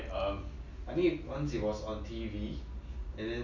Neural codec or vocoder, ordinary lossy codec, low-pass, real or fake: none; none; 7.2 kHz; real